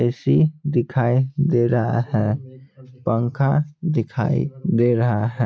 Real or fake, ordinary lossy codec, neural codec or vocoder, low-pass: real; none; none; none